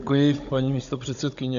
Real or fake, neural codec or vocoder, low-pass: fake; codec, 16 kHz, 16 kbps, FunCodec, trained on Chinese and English, 50 frames a second; 7.2 kHz